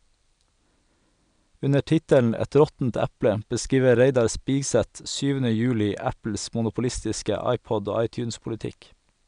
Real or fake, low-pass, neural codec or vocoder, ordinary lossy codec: real; 9.9 kHz; none; none